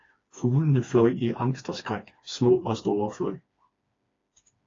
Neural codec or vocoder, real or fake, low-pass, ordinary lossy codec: codec, 16 kHz, 2 kbps, FreqCodec, smaller model; fake; 7.2 kHz; AAC, 32 kbps